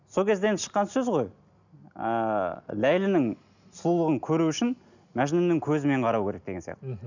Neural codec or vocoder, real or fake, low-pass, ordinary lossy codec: none; real; 7.2 kHz; none